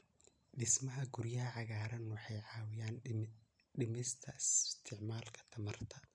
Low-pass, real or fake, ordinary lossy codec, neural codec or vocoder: 9.9 kHz; real; none; none